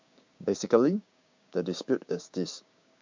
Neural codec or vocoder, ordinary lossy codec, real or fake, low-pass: codec, 16 kHz, 6 kbps, DAC; MP3, 64 kbps; fake; 7.2 kHz